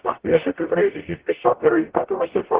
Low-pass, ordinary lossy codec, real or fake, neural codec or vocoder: 3.6 kHz; Opus, 16 kbps; fake; codec, 44.1 kHz, 0.9 kbps, DAC